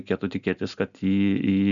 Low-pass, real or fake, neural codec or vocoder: 7.2 kHz; real; none